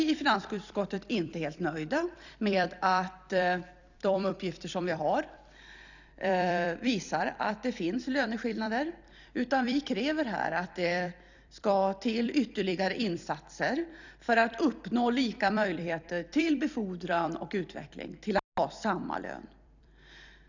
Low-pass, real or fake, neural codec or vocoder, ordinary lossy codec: 7.2 kHz; fake; vocoder, 44.1 kHz, 128 mel bands every 256 samples, BigVGAN v2; none